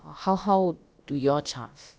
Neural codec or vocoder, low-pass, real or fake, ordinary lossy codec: codec, 16 kHz, about 1 kbps, DyCAST, with the encoder's durations; none; fake; none